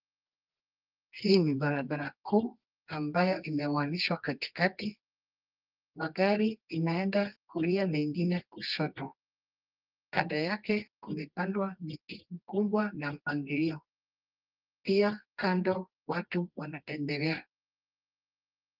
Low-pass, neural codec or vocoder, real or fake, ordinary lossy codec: 5.4 kHz; codec, 24 kHz, 0.9 kbps, WavTokenizer, medium music audio release; fake; Opus, 32 kbps